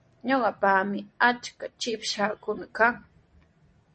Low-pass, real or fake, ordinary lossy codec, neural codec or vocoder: 10.8 kHz; real; MP3, 32 kbps; none